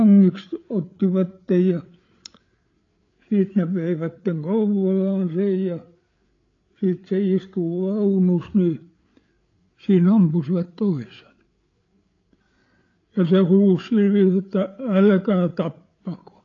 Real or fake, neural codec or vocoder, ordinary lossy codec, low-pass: fake; codec, 16 kHz, 8 kbps, FreqCodec, larger model; AAC, 32 kbps; 7.2 kHz